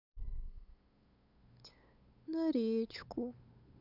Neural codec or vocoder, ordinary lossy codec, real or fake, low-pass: codec, 16 kHz, 8 kbps, FunCodec, trained on LibriTTS, 25 frames a second; none; fake; 5.4 kHz